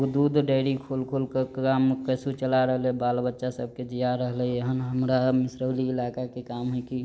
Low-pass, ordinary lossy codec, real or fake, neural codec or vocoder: none; none; real; none